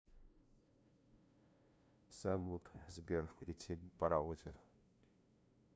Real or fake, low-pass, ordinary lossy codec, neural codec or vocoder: fake; none; none; codec, 16 kHz, 0.5 kbps, FunCodec, trained on LibriTTS, 25 frames a second